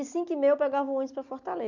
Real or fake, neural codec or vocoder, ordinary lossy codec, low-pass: real; none; none; 7.2 kHz